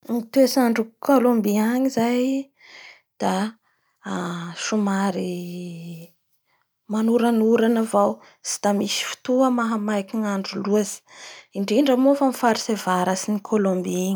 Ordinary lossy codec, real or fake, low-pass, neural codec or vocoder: none; real; none; none